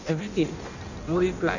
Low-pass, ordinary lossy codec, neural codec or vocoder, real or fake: 7.2 kHz; none; codec, 16 kHz in and 24 kHz out, 0.6 kbps, FireRedTTS-2 codec; fake